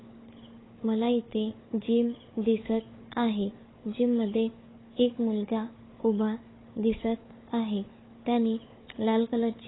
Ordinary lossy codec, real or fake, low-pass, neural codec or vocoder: AAC, 16 kbps; fake; 7.2 kHz; codec, 16 kHz, 4 kbps, FunCodec, trained on Chinese and English, 50 frames a second